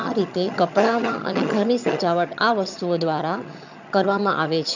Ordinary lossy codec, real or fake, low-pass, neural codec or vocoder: none; fake; 7.2 kHz; vocoder, 22.05 kHz, 80 mel bands, HiFi-GAN